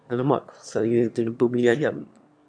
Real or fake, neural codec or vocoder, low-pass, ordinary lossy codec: fake; autoencoder, 22.05 kHz, a latent of 192 numbers a frame, VITS, trained on one speaker; 9.9 kHz; AAC, 64 kbps